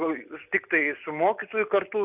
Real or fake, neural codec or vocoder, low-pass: real; none; 3.6 kHz